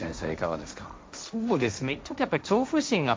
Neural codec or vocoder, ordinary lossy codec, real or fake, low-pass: codec, 16 kHz, 1.1 kbps, Voila-Tokenizer; none; fake; none